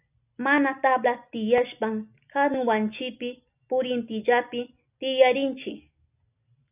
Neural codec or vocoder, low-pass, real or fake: none; 3.6 kHz; real